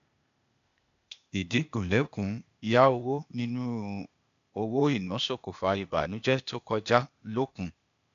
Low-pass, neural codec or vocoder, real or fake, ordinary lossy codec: 7.2 kHz; codec, 16 kHz, 0.8 kbps, ZipCodec; fake; AAC, 96 kbps